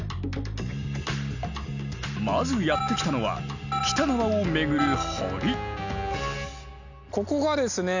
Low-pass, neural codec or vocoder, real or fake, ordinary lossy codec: 7.2 kHz; none; real; none